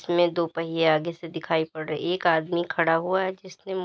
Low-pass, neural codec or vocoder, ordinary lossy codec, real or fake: none; none; none; real